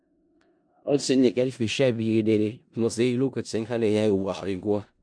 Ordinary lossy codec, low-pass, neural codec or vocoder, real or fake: MP3, 64 kbps; 9.9 kHz; codec, 16 kHz in and 24 kHz out, 0.4 kbps, LongCat-Audio-Codec, four codebook decoder; fake